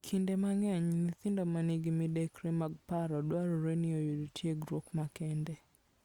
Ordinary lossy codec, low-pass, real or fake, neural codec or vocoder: Opus, 64 kbps; 19.8 kHz; real; none